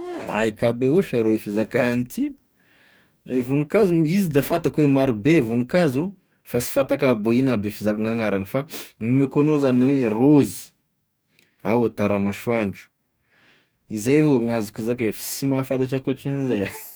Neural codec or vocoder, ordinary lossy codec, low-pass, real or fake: codec, 44.1 kHz, 2.6 kbps, DAC; none; none; fake